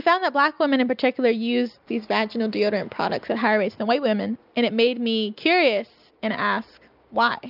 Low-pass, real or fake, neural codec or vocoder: 5.4 kHz; real; none